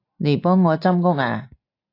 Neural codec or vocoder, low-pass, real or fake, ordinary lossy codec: none; 5.4 kHz; real; AAC, 32 kbps